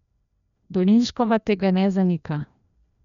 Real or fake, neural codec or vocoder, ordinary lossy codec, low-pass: fake; codec, 16 kHz, 1 kbps, FreqCodec, larger model; none; 7.2 kHz